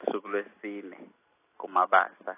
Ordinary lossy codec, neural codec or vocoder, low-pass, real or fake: AAC, 16 kbps; none; 3.6 kHz; real